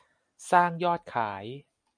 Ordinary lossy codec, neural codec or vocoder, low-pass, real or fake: MP3, 96 kbps; none; 9.9 kHz; real